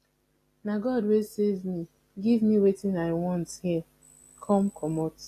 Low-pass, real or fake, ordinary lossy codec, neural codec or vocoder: 14.4 kHz; real; AAC, 48 kbps; none